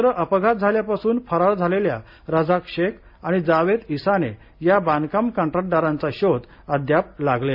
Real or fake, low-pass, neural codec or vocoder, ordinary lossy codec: real; 5.4 kHz; none; MP3, 32 kbps